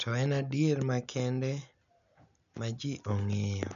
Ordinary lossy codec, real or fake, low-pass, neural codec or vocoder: none; real; 7.2 kHz; none